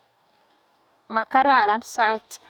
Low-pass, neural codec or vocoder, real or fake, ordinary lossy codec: 19.8 kHz; codec, 44.1 kHz, 2.6 kbps, DAC; fake; none